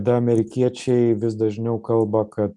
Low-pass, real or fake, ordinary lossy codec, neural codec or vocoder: 10.8 kHz; real; MP3, 96 kbps; none